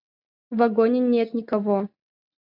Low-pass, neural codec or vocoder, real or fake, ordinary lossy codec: 5.4 kHz; none; real; MP3, 48 kbps